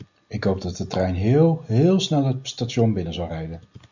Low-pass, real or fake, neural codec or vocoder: 7.2 kHz; real; none